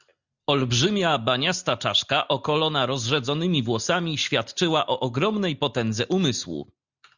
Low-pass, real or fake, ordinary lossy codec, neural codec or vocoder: 7.2 kHz; real; Opus, 64 kbps; none